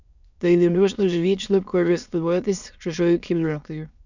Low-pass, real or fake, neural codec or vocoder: 7.2 kHz; fake; autoencoder, 22.05 kHz, a latent of 192 numbers a frame, VITS, trained on many speakers